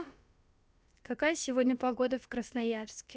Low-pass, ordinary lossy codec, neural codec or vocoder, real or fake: none; none; codec, 16 kHz, about 1 kbps, DyCAST, with the encoder's durations; fake